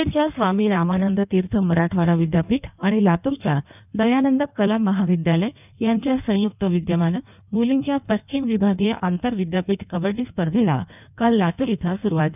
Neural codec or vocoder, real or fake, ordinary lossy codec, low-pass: codec, 16 kHz in and 24 kHz out, 1.1 kbps, FireRedTTS-2 codec; fake; none; 3.6 kHz